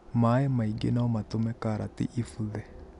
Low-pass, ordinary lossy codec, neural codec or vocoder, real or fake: 10.8 kHz; none; none; real